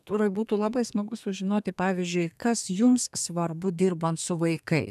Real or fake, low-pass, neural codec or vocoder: fake; 14.4 kHz; codec, 32 kHz, 1.9 kbps, SNAC